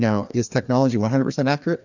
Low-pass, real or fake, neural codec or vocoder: 7.2 kHz; fake; codec, 16 kHz, 2 kbps, FreqCodec, larger model